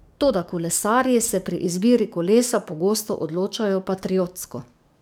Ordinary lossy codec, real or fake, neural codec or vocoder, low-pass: none; fake; codec, 44.1 kHz, 7.8 kbps, DAC; none